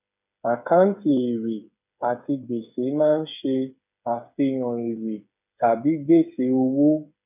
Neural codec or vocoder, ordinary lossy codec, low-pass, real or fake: codec, 16 kHz, 8 kbps, FreqCodec, smaller model; none; 3.6 kHz; fake